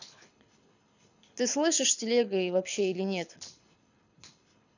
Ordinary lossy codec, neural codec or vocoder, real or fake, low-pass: none; codec, 24 kHz, 6 kbps, HILCodec; fake; 7.2 kHz